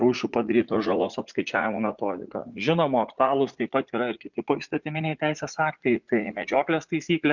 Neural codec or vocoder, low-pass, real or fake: vocoder, 44.1 kHz, 80 mel bands, Vocos; 7.2 kHz; fake